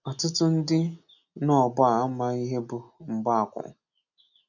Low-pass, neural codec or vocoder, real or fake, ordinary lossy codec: 7.2 kHz; none; real; none